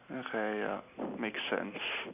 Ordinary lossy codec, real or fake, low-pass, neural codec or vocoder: none; real; 3.6 kHz; none